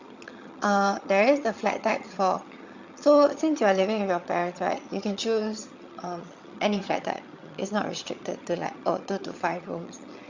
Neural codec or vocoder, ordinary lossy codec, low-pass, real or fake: vocoder, 22.05 kHz, 80 mel bands, HiFi-GAN; Opus, 64 kbps; 7.2 kHz; fake